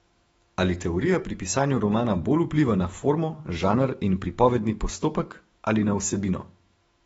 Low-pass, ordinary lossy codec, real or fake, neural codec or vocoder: 19.8 kHz; AAC, 24 kbps; fake; autoencoder, 48 kHz, 128 numbers a frame, DAC-VAE, trained on Japanese speech